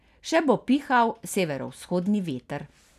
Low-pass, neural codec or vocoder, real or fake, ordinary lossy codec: 14.4 kHz; none; real; none